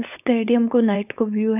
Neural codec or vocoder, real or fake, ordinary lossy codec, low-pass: codec, 16 kHz, 4.8 kbps, FACodec; fake; none; 3.6 kHz